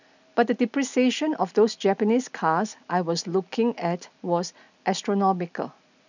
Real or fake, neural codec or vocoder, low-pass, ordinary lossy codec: real; none; 7.2 kHz; none